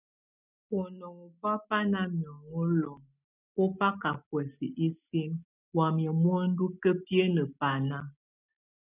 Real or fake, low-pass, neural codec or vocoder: real; 3.6 kHz; none